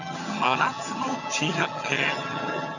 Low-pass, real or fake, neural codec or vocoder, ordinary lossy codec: 7.2 kHz; fake; vocoder, 22.05 kHz, 80 mel bands, HiFi-GAN; none